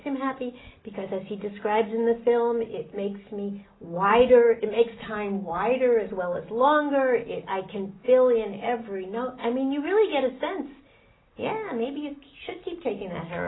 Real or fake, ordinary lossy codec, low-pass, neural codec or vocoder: real; AAC, 16 kbps; 7.2 kHz; none